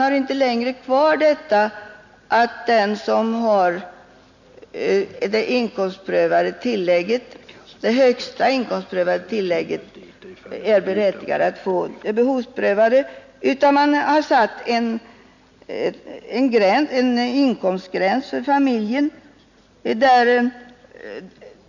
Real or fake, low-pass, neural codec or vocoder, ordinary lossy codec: real; 7.2 kHz; none; none